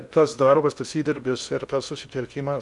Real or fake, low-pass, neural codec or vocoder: fake; 10.8 kHz; codec, 16 kHz in and 24 kHz out, 0.6 kbps, FocalCodec, streaming, 2048 codes